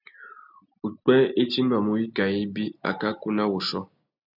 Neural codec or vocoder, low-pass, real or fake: none; 5.4 kHz; real